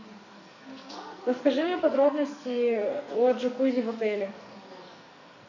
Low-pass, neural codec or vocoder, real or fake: 7.2 kHz; codec, 44.1 kHz, 2.6 kbps, SNAC; fake